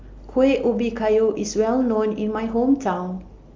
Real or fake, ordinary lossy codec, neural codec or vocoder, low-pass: real; Opus, 32 kbps; none; 7.2 kHz